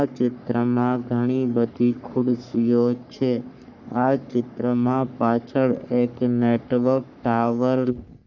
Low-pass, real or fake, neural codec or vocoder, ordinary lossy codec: 7.2 kHz; fake; codec, 44.1 kHz, 3.4 kbps, Pupu-Codec; none